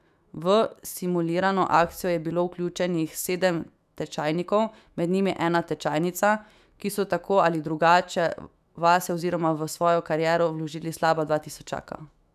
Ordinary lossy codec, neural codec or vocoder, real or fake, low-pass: none; autoencoder, 48 kHz, 128 numbers a frame, DAC-VAE, trained on Japanese speech; fake; 14.4 kHz